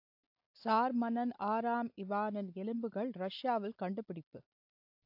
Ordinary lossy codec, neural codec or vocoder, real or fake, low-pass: AAC, 48 kbps; none; real; 5.4 kHz